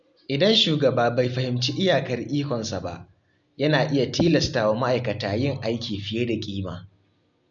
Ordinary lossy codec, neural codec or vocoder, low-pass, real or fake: none; none; 7.2 kHz; real